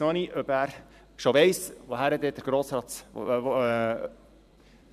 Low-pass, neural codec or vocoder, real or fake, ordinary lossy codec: 14.4 kHz; vocoder, 44.1 kHz, 128 mel bands every 512 samples, BigVGAN v2; fake; none